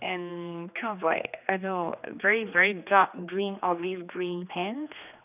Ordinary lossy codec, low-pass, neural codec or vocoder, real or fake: none; 3.6 kHz; codec, 16 kHz, 2 kbps, X-Codec, HuBERT features, trained on general audio; fake